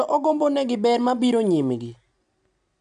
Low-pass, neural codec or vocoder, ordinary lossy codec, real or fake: 9.9 kHz; none; AAC, 96 kbps; real